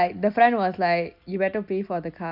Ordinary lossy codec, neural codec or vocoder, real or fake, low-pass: none; none; real; 5.4 kHz